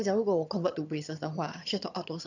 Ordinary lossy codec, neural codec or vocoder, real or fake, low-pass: none; vocoder, 22.05 kHz, 80 mel bands, HiFi-GAN; fake; 7.2 kHz